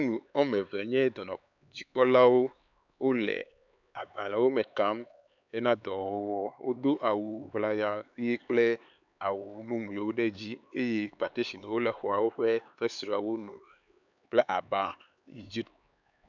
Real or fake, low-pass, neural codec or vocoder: fake; 7.2 kHz; codec, 16 kHz, 4 kbps, X-Codec, HuBERT features, trained on LibriSpeech